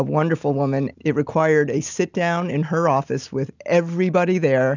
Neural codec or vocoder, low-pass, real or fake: none; 7.2 kHz; real